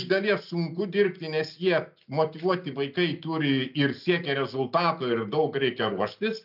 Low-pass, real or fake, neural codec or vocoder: 5.4 kHz; real; none